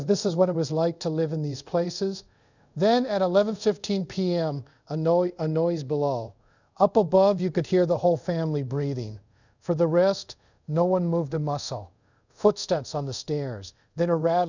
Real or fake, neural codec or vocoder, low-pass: fake; codec, 24 kHz, 0.5 kbps, DualCodec; 7.2 kHz